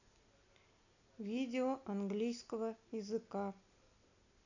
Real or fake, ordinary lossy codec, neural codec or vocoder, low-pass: real; none; none; 7.2 kHz